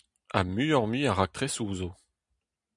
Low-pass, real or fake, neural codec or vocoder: 10.8 kHz; real; none